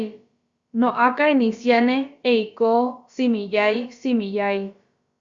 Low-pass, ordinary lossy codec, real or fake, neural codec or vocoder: 7.2 kHz; Opus, 64 kbps; fake; codec, 16 kHz, about 1 kbps, DyCAST, with the encoder's durations